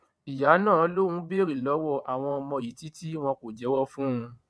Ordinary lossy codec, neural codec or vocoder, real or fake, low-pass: none; vocoder, 22.05 kHz, 80 mel bands, WaveNeXt; fake; none